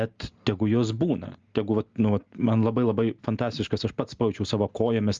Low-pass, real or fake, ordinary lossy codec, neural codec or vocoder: 7.2 kHz; real; Opus, 32 kbps; none